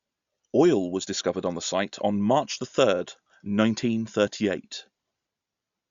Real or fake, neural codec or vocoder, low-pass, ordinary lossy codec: real; none; 7.2 kHz; Opus, 64 kbps